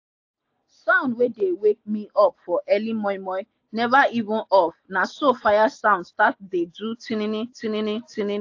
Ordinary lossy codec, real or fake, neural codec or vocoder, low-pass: AAC, 48 kbps; real; none; 7.2 kHz